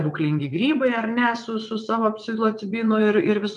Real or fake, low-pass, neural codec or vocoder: fake; 9.9 kHz; vocoder, 22.05 kHz, 80 mel bands, WaveNeXt